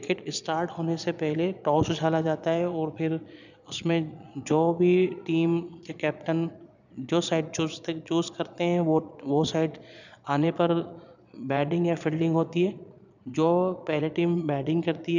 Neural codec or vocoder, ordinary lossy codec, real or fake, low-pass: vocoder, 22.05 kHz, 80 mel bands, Vocos; none; fake; 7.2 kHz